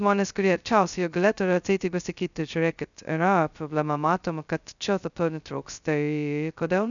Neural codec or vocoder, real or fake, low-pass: codec, 16 kHz, 0.2 kbps, FocalCodec; fake; 7.2 kHz